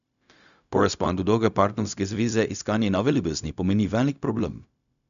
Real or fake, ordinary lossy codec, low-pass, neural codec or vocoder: fake; none; 7.2 kHz; codec, 16 kHz, 0.4 kbps, LongCat-Audio-Codec